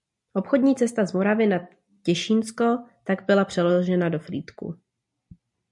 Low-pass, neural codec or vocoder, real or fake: 10.8 kHz; none; real